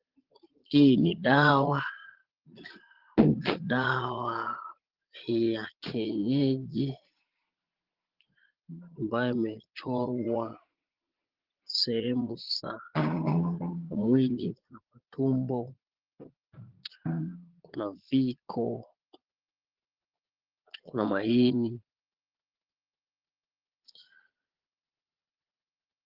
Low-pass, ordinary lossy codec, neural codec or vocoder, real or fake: 5.4 kHz; Opus, 16 kbps; vocoder, 22.05 kHz, 80 mel bands, Vocos; fake